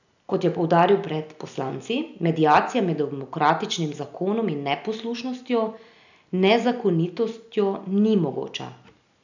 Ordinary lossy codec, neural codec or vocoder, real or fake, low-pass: none; none; real; 7.2 kHz